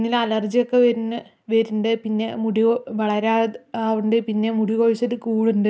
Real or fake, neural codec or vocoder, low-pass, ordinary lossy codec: real; none; none; none